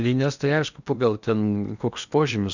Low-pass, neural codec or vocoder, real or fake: 7.2 kHz; codec, 16 kHz in and 24 kHz out, 0.8 kbps, FocalCodec, streaming, 65536 codes; fake